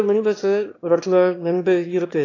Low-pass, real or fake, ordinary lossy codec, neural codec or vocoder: 7.2 kHz; fake; AAC, 32 kbps; autoencoder, 22.05 kHz, a latent of 192 numbers a frame, VITS, trained on one speaker